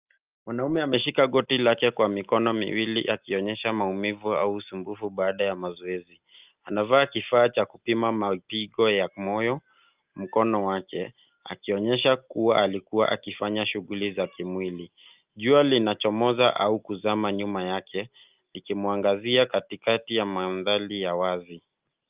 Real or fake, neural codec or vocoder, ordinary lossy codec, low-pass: real; none; Opus, 24 kbps; 3.6 kHz